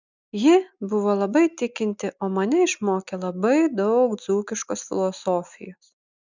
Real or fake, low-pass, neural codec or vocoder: real; 7.2 kHz; none